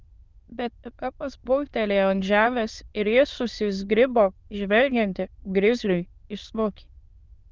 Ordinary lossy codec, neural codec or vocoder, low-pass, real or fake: Opus, 24 kbps; autoencoder, 22.05 kHz, a latent of 192 numbers a frame, VITS, trained on many speakers; 7.2 kHz; fake